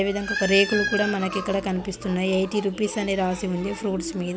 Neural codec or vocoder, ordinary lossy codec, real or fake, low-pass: none; none; real; none